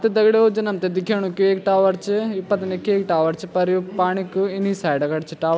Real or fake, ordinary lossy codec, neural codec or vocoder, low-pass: real; none; none; none